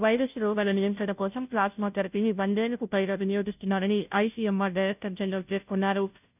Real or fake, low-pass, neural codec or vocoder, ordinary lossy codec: fake; 3.6 kHz; codec, 16 kHz, 0.5 kbps, FunCodec, trained on Chinese and English, 25 frames a second; none